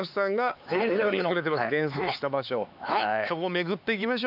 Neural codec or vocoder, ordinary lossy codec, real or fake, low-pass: codec, 16 kHz, 4 kbps, X-Codec, HuBERT features, trained on LibriSpeech; AAC, 48 kbps; fake; 5.4 kHz